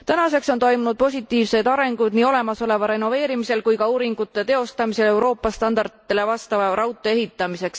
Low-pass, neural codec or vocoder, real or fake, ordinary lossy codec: none; none; real; none